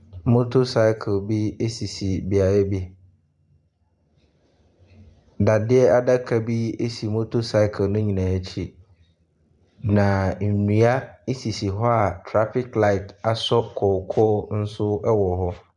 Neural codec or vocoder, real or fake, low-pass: vocoder, 44.1 kHz, 128 mel bands every 512 samples, BigVGAN v2; fake; 10.8 kHz